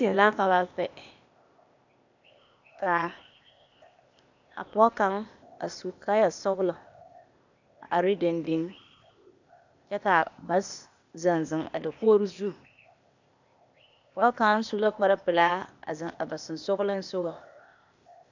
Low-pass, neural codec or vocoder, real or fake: 7.2 kHz; codec, 16 kHz, 0.8 kbps, ZipCodec; fake